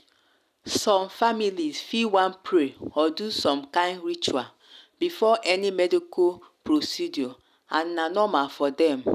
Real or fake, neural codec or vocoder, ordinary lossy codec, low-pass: real; none; none; 14.4 kHz